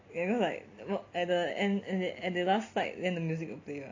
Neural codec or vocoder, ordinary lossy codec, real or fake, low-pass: none; AAC, 48 kbps; real; 7.2 kHz